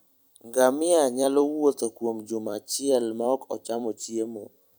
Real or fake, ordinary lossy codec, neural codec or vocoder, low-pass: fake; none; vocoder, 44.1 kHz, 128 mel bands every 256 samples, BigVGAN v2; none